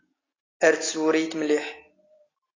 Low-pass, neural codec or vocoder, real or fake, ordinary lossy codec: 7.2 kHz; none; real; AAC, 32 kbps